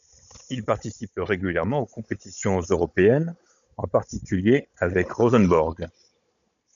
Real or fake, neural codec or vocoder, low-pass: fake; codec, 16 kHz, 16 kbps, FunCodec, trained on Chinese and English, 50 frames a second; 7.2 kHz